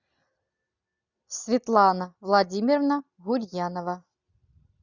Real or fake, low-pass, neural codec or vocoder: real; 7.2 kHz; none